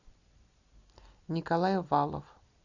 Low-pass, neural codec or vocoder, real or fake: 7.2 kHz; none; real